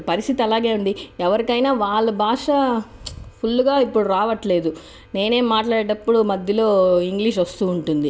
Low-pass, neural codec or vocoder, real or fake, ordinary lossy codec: none; none; real; none